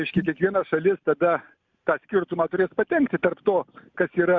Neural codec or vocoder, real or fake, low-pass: none; real; 7.2 kHz